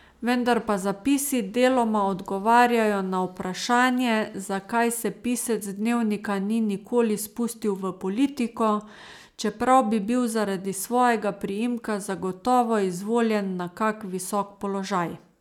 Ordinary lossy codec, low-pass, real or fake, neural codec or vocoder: none; 19.8 kHz; real; none